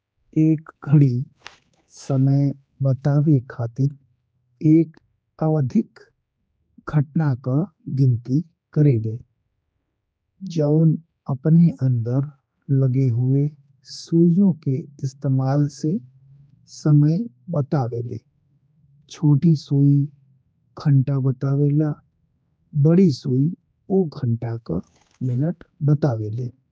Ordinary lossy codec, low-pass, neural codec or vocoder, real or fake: none; none; codec, 16 kHz, 2 kbps, X-Codec, HuBERT features, trained on general audio; fake